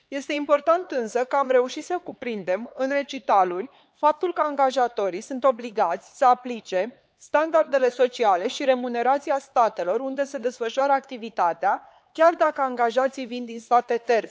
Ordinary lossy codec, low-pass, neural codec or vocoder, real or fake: none; none; codec, 16 kHz, 4 kbps, X-Codec, HuBERT features, trained on LibriSpeech; fake